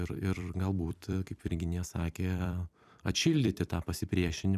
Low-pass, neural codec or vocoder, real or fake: 14.4 kHz; vocoder, 44.1 kHz, 128 mel bands every 256 samples, BigVGAN v2; fake